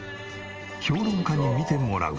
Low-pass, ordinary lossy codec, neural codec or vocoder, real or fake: 7.2 kHz; Opus, 24 kbps; none; real